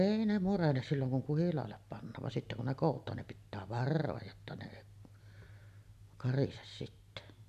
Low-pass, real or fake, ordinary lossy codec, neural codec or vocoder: 14.4 kHz; real; AAC, 96 kbps; none